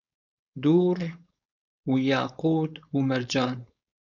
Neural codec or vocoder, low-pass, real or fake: codec, 16 kHz, 4.8 kbps, FACodec; 7.2 kHz; fake